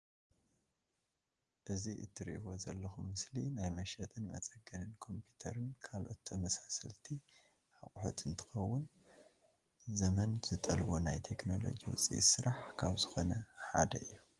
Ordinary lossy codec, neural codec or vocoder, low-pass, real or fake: Opus, 24 kbps; none; 10.8 kHz; real